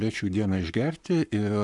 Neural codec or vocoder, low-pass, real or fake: codec, 44.1 kHz, 7.8 kbps, Pupu-Codec; 10.8 kHz; fake